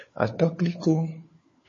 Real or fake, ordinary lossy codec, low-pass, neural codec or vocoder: fake; MP3, 32 kbps; 7.2 kHz; codec, 16 kHz, 4 kbps, FunCodec, trained on LibriTTS, 50 frames a second